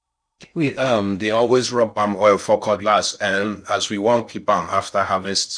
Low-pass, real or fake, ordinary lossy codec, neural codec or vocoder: 9.9 kHz; fake; none; codec, 16 kHz in and 24 kHz out, 0.6 kbps, FocalCodec, streaming, 2048 codes